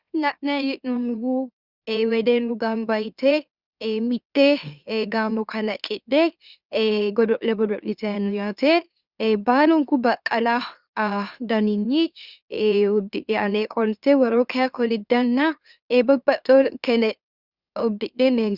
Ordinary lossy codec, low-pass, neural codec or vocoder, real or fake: Opus, 64 kbps; 5.4 kHz; autoencoder, 44.1 kHz, a latent of 192 numbers a frame, MeloTTS; fake